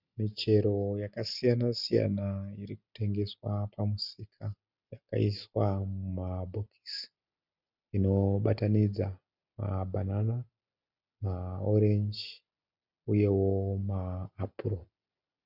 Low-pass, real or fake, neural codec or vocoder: 5.4 kHz; real; none